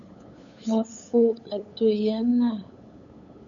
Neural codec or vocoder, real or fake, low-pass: codec, 16 kHz, 8 kbps, FunCodec, trained on LibriTTS, 25 frames a second; fake; 7.2 kHz